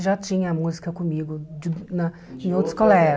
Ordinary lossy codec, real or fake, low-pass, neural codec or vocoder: none; real; none; none